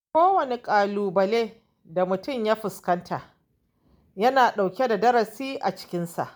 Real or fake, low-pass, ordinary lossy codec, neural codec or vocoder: real; none; none; none